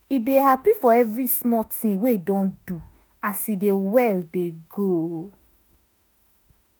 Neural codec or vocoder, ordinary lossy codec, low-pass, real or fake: autoencoder, 48 kHz, 32 numbers a frame, DAC-VAE, trained on Japanese speech; none; none; fake